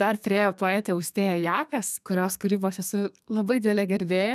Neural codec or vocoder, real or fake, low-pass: codec, 32 kHz, 1.9 kbps, SNAC; fake; 14.4 kHz